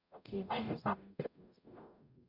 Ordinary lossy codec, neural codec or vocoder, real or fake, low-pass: none; codec, 44.1 kHz, 0.9 kbps, DAC; fake; 5.4 kHz